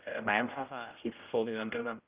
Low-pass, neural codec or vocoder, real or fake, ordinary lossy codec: 3.6 kHz; codec, 16 kHz, 0.5 kbps, X-Codec, HuBERT features, trained on general audio; fake; Opus, 24 kbps